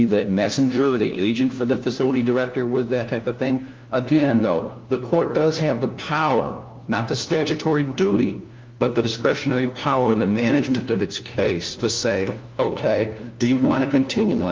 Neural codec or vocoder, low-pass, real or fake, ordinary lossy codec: codec, 16 kHz, 1 kbps, FunCodec, trained on LibriTTS, 50 frames a second; 7.2 kHz; fake; Opus, 32 kbps